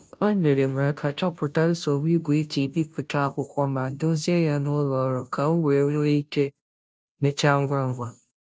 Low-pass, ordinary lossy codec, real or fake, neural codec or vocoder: none; none; fake; codec, 16 kHz, 0.5 kbps, FunCodec, trained on Chinese and English, 25 frames a second